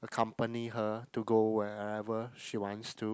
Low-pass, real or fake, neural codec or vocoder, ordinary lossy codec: none; real; none; none